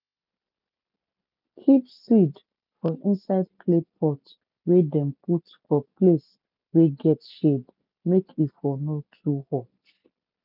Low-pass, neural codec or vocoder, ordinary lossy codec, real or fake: 5.4 kHz; none; none; real